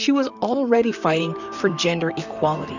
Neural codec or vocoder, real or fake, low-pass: vocoder, 44.1 kHz, 128 mel bands, Pupu-Vocoder; fake; 7.2 kHz